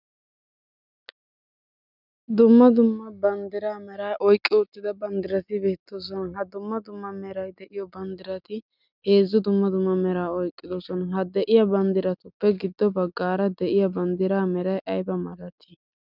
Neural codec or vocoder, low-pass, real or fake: none; 5.4 kHz; real